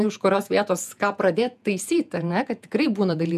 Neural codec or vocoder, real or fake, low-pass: vocoder, 48 kHz, 128 mel bands, Vocos; fake; 14.4 kHz